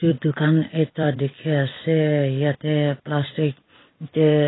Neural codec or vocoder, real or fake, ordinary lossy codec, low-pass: vocoder, 44.1 kHz, 128 mel bands every 256 samples, BigVGAN v2; fake; AAC, 16 kbps; 7.2 kHz